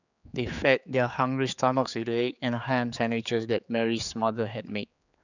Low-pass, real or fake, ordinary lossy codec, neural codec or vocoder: 7.2 kHz; fake; none; codec, 16 kHz, 4 kbps, X-Codec, HuBERT features, trained on general audio